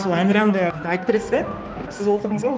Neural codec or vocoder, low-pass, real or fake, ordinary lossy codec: codec, 16 kHz, 2 kbps, X-Codec, HuBERT features, trained on balanced general audio; none; fake; none